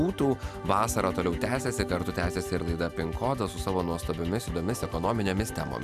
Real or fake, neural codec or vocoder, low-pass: real; none; 14.4 kHz